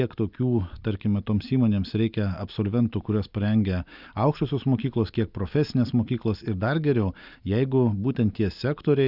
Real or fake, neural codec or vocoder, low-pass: real; none; 5.4 kHz